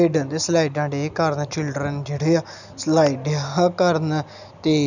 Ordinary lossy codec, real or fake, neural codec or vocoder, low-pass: none; real; none; 7.2 kHz